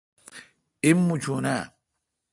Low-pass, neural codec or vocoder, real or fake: 10.8 kHz; none; real